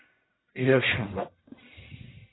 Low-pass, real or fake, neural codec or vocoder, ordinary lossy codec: 7.2 kHz; fake; codec, 44.1 kHz, 1.7 kbps, Pupu-Codec; AAC, 16 kbps